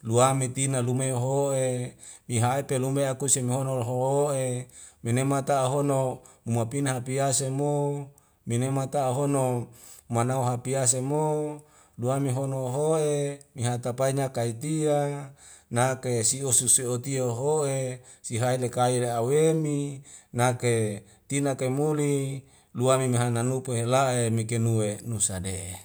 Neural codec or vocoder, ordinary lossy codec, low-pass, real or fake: none; none; none; real